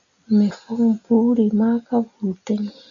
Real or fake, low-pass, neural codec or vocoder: real; 7.2 kHz; none